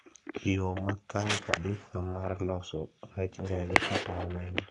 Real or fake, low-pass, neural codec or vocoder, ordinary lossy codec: fake; 10.8 kHz; codec, 44.1 kHz, 3.4 kbps, Pupu-Codec; none